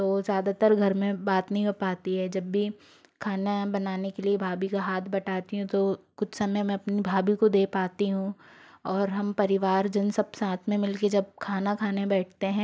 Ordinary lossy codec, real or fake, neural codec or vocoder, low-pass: none; real; none; none